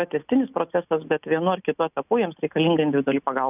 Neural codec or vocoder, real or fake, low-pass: none; real; 3.6 kHz